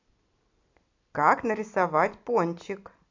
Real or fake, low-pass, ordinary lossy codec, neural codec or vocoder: real; 7.2 kHz; AAC, 48 kbps; none